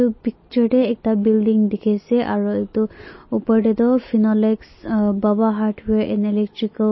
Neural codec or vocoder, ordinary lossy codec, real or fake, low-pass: none; MP3, 24 kbps; real; 7.2 kHz